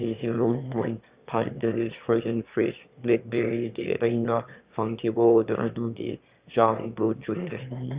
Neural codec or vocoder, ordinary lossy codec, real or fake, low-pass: autoencoder, 22.05 kHz, a latent of 192 numbers a frame, VITS, trained on one speaker; Opus, 64 kbps; fake; 3.6 kHz